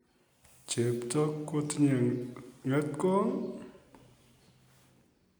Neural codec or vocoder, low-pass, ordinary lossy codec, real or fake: none; none; none; real